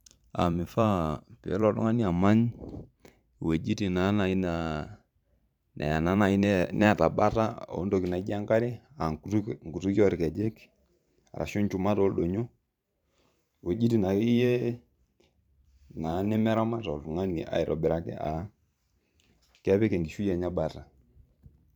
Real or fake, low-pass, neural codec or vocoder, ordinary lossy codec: fake; 19.8 kHz; vocoder, 44.1 kHz, 128 mel bands every 512 samples, BigVGAN v2; none